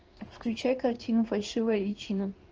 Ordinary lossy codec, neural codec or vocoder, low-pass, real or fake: Opus, 24 kbps; codec, 16 kHz in and 24 kHz out, 1 kbps, XY-Tokenizer; 7.2 kHz; fake